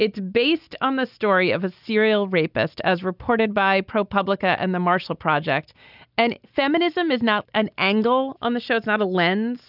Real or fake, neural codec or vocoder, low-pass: real; none; 5.4 kHz